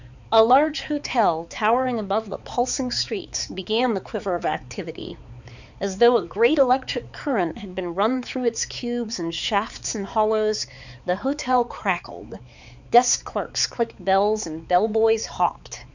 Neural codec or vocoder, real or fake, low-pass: codec, 16 kHz, 4 kbps, X-Codec, HuBERT features, trained on balanced general audio; fake; 7.2 kHz